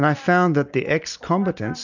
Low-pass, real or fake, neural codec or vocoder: 7.2 kHz; real; none